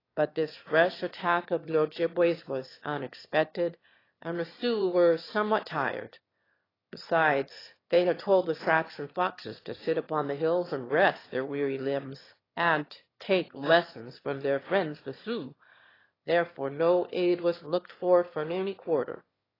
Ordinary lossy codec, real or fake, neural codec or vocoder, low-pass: AAC, 24 kbps; fake; autoencoder, 22.05 kHz, a latent of 192 numbers a frame, VITS, trained on one speaker; 5.4 kHz